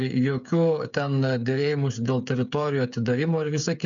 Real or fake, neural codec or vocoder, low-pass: fake; codec, 16 kHz, 8 kbps, FreqCodec, smaller model; 7.2 kHz